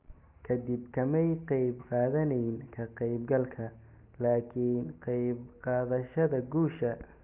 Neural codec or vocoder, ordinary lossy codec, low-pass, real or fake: none; Opus, 24 kbps; 3.6 kHz; real